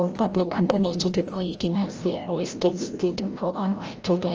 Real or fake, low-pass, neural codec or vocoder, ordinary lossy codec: fake; 7.2 kHz; codec, 16 kHz, 0.5 kbps, FreqCodec, larger model; Opus, 24 kbps